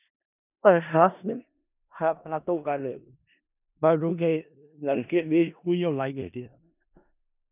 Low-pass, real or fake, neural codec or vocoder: 3.6 kHz; fake; codec, 16 kHz in and 24 kHz out, 0.4 kbps, LongCat-Audio-Codec, four codebook decoder